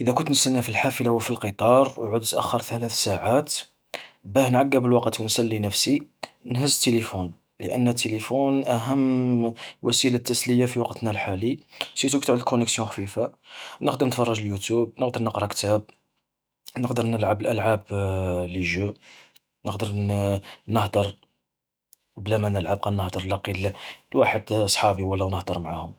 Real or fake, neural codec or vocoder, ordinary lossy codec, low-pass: fake; autoencoder, 48 kHz, 128 numbers a frame, DAC-VAE, trained on Japanese speech; none; none